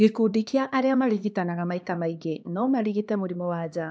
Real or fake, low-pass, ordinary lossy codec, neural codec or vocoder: fake; none; none; codec, 16 kHz, 2 kbps, X-Codec, HuBERT features, trained on LibriSpeech